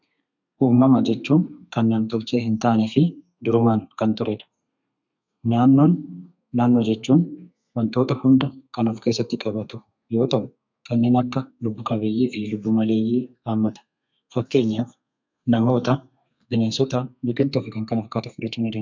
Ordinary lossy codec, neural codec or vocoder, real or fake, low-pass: MP3, 64 kbps; codec, 32 kHz, 1.9 kbps, SNAC; fake; 7.2 kHz